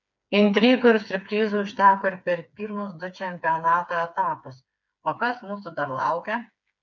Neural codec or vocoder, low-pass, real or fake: codec, 16 kHz, 4 kbps, FreqCodec, smaller model; 7.2 kHz; fake